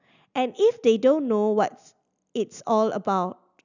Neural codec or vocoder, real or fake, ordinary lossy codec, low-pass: none; real; none; 7.2 kHz